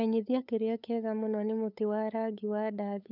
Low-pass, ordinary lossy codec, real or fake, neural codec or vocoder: 5.4 kHz; none; fake; codec, 16 kHz, 8 kbps, FunCodec, trained on LibriTTS, 25 frames a second